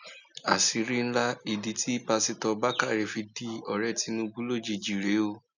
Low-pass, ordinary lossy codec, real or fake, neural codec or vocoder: 7.2 kHz; none; real; none